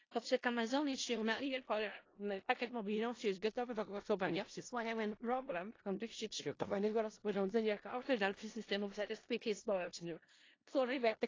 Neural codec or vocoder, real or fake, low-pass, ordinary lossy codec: codec, 16 kHz in and 24 kHz out, 0.4 kbps, LongCat-Audio-Codec, four codebook decoder; fake; 7.2 kHz; AAC, 32 kbps